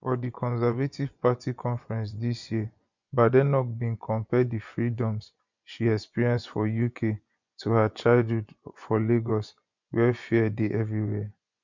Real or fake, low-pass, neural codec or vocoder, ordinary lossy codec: fake; 7.2 kHz; vocoder, 24 kHz, 100 mel bands, Vocos; none